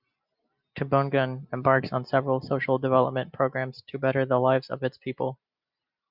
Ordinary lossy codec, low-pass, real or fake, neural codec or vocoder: Opus, 64 kbps; 5.4 kHz; real; none